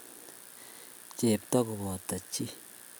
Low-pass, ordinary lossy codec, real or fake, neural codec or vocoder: none; none; real; none